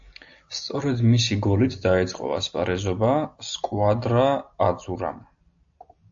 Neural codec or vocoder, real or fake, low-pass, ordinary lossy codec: none; real; 7.2 kHz; AAC, 64 kbps